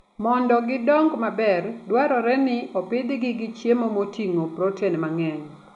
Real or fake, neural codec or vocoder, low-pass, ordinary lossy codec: real; none; 10.8 kHz; none